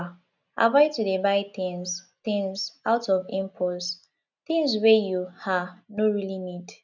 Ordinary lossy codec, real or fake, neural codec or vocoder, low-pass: none; real; none; 7.2 kHz